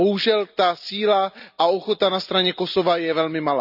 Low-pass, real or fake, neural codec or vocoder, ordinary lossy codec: 5.4 kHz; real; none; none